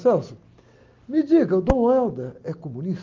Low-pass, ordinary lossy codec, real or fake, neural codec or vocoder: 7.2 kHz; Opus, 32 kbps; real; none